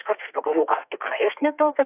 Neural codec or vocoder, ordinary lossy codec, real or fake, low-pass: codec, 32 kHz, 1.9 kbps, SNAC; AAC, 32 kbps; fake; 3.6 kHz